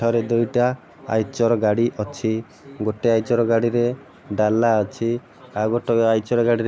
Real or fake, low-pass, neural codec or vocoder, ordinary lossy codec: real; none; none; none